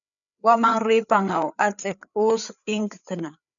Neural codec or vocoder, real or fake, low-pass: codec, 16 kHz, 4 kbps, FreqCodec, larger model; fake; 7.2 kHz